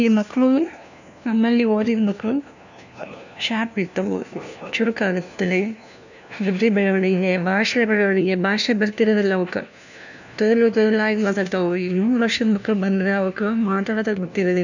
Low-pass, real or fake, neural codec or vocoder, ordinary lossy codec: 7.2 kHz; fake; codec, 16 kHz, 1 kbps, FunCodec, trained on LibriTTS, 50 frames a second; none